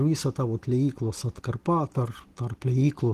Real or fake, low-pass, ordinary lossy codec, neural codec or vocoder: real; 14.4 kHz; Opus, 16 kbps; none